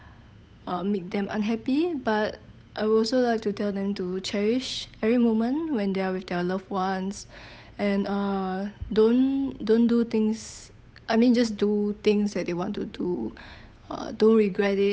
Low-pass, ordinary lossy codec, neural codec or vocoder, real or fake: none; none; codec, 16 kHz, 8 kbps, FunCodec, trained on Chinese and English, 25 frames a second; fake